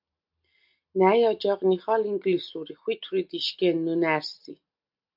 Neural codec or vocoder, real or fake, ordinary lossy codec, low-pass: none; real; MP3, 48 kbps; 5.4 kHz